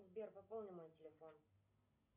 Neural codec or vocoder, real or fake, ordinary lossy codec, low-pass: none; real; MP3, 32 kbps; 3.6 kHz